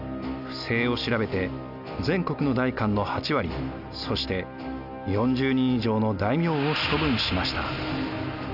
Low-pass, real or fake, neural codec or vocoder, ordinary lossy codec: 5.4 kHz; real; none; none